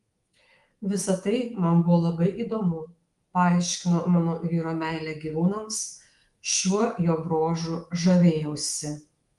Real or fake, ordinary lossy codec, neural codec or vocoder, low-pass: fake; Opus, 24 kbps; codec, 24 kHz, 3.1 kbps, DualCodec; 10.8 kHz